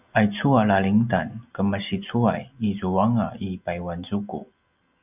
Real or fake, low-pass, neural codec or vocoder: real; 3.6 kHz; none